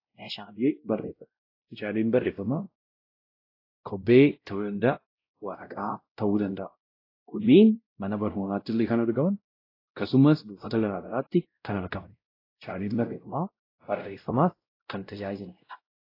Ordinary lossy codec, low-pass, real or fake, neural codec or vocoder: AAC, 32 kbps; 5.4 kHz; fake; codec, 16 kHz, 0.5 kbps, X-Codec, WavLM features, trained on Multilingual LibriSpeech